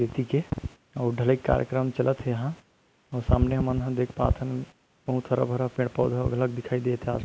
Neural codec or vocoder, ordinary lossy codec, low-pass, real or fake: none; none; none; real